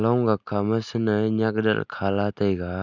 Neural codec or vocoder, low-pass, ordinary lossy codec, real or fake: none; 7.2 kHz; none; real